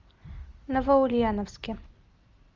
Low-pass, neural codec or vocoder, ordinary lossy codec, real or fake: 7.2 kHz; none; Opus, 32 kbps; real